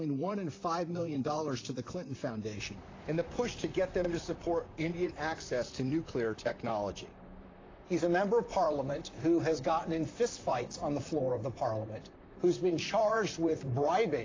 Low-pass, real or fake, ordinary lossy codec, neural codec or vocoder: 7.2 kHz; fake; AAC, 32 kbps; vocoder, 44.1 kHz, 128 mel bands, Pupu-Vocoder